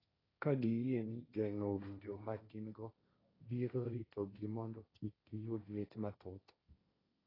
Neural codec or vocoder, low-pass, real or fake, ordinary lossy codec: codec, 16 kHz, 1.1 kbps, Voila-Tokenizer; 5.4 kHz; fake; AAC, 24 kbps